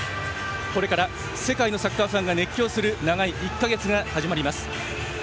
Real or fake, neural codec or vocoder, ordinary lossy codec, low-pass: real; none; none; none